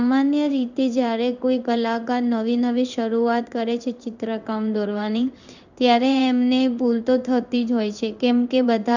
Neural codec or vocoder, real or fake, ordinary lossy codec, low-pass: codec, 16 kHz in and 24 kHz out, 1 kbps, XY-Tokenizer; fake; none; 7.2 kHz